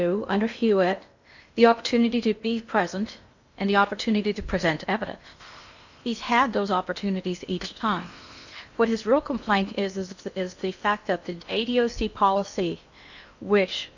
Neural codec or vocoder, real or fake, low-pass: codec, 16 kHz in and 24 kHz out, 0.8 kbps, FocalCodec, streaming, 65536 codes; fake; 7.2 kHz